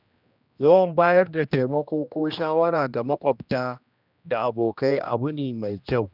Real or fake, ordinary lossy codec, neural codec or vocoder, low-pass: fake; none; codec, 16 kHz, 1 kbps, X-Codec, HuBERT features, trained on general audio; 5.4 kHz